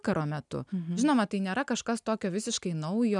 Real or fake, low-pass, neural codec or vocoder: real; 10.8 kHz; none